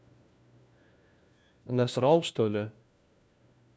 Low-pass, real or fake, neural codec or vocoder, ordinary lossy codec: none; fake; codec, 16 kHz, 1 kbps, FunCodec, trained on LibriTTS, 50 frames a second; none